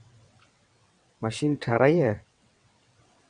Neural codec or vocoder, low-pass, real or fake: vocoder, 22.05 kHz, 80 mel bands, WaveNeXt; 9.9 kHz; fake